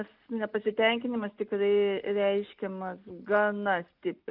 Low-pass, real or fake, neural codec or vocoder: 5.4 kHz; real; none